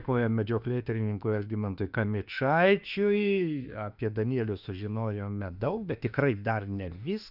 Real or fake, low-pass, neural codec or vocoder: fake; 5.4 kHz; autoencoder, 48 kHz, 32 numbers a frame, DAC-VAE, trained on Japanese speech